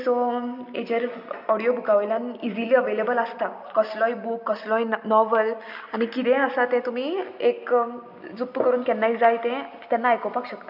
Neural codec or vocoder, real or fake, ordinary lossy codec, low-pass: none; real; none; 5.4 kHz